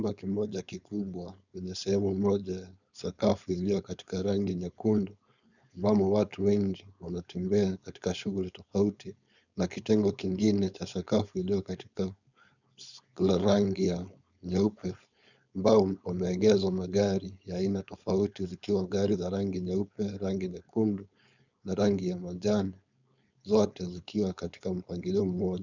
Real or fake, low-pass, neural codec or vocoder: fake; 7.2 kHz; codec, 16 kHz, 4.8 kbps, FACodec